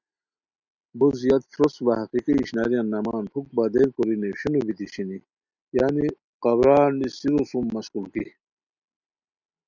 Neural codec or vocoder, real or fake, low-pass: none; real; 7.2 kHz